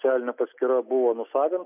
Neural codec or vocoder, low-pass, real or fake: none; 3.6 kHz; real